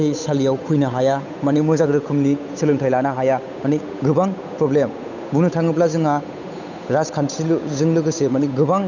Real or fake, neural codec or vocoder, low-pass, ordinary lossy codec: fake; codec, 16 kHz, 8 kbps, FunCodec, trained on Chinese and English, 25 frames a second; 7.2 kHz; none